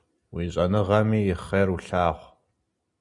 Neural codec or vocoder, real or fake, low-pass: none; real; 10.8 kHz